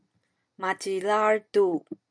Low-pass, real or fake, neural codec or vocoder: 9.9 kHz; real; none